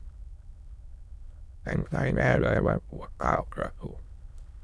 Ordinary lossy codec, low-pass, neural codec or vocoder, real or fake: none; none; autoencoder, 22.05 kHz, a latent of 192 numbers a frame, VITS, trained on many speakers; fake